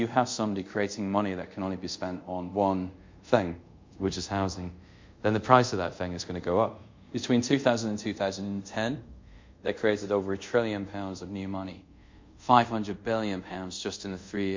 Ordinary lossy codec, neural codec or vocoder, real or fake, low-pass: MP3, 48 kbps; codec, 24 kHz, 0.5 kbps, DualCodec; fake; 7.2 kHz